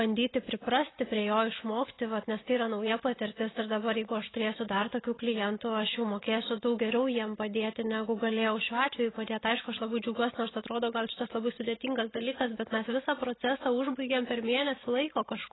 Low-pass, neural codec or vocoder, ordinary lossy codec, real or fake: 7.2 kHz; none; AAC, 16 kbps; real